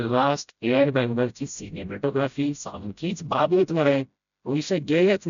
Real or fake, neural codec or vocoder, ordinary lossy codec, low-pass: fake; codec, 16 kHz, 0.5 kbps, FreqCodec, smaller model; MP3, 96 kbps; 7.2 kHz